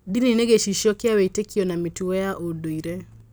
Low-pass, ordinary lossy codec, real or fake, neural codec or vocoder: none; none; real; none